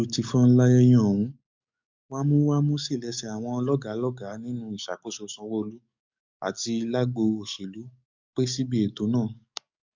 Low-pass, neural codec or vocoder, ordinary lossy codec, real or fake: 7.2 kHz; codec, 44.1 kHz, 7.8 kbps, DAC; MP3, 64 kbps; fake